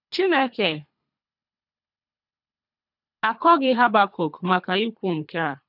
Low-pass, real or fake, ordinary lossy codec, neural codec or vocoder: 5.4 kHz; fake; none; codec, 24 kHz, 3 kbps, HILCodec